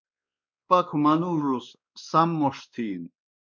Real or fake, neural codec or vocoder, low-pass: fake; codec, 16 kHz, 2 kbps, X-Codec, WavLM features, trained on Multilingual LibriSpeech; 7.2 kHz